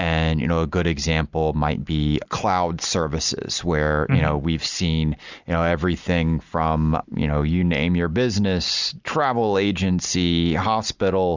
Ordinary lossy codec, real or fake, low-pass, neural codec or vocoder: Opus, 64 kbps; real; 7.2 kHz; none